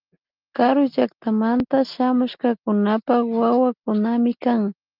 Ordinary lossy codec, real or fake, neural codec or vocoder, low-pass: Opus, 24 kbps; real; none; 5.4 kHz